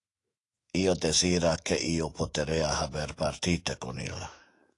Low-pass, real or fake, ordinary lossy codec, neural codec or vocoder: 10.8 kHz; fake; AAC, 48 kbps; autoencoder, 48 kHz, 128 numbers a frame, DAC-VAE, trained on Japanese speech